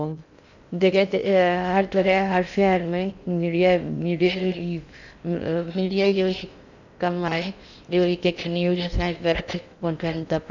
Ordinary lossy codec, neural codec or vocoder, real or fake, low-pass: none; codec, 16 kHz in and 24 kHz out, 0.6 kbps, FocalCodec, streaming, 2048 codes; fake; 7.2 kHz